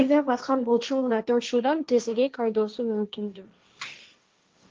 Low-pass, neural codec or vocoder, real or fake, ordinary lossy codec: 7.2 kHz; codec, 16 kHz, 1.1 kbps, Voila-Tokenizer; fake; Opus, 24 kbps